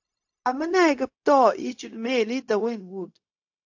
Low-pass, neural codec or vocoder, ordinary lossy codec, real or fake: 7.2 kHz; codec, 16 kHz, 0.4 kbps, LongCat-Audio-Codec; AAC, 48 kbps; fake